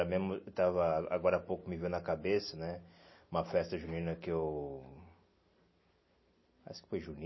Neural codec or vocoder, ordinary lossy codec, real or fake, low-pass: none; MP3, 24 kbps; real; 7.2 kHz